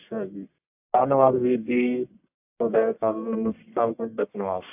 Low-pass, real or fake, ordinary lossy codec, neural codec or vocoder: 3.6 kHz; fake; AAC, 24 kbps; codec, 44.1 kHz, 1.7 kbps, Pupu-Codec